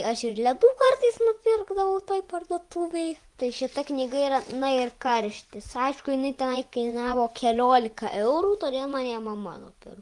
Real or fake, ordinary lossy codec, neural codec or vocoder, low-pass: fake; Opus, 32 kbps; vocoder, 24 kHz, 100 mel bands, Vocos; 10.8 kHz